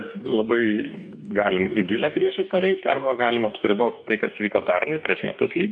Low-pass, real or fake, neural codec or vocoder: 9.9 kHz; fake; codec, 44.1 kHz, 2.6 kbps, DAC